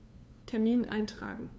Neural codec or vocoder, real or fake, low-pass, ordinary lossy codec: codec, 16 kHz, 2 kbps, FunCodec, trained on LibriTTS, 25 frames a second; fake; none; none